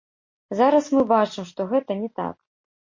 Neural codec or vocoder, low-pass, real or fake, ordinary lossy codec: none; 7.2 kHz; real; MP3, 32 kbps